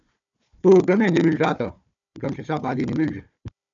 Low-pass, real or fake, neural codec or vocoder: 7.2 kHz; fake; codec, 16 kHz, 4 kbps, FunCodec, trained on Chinese and English, 50 frames a second